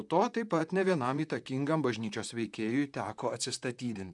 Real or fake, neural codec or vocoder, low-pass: fake; vocoder, 44.1 kHz, 128 mel bands, Pupu-Vocoder; 10.8 kHz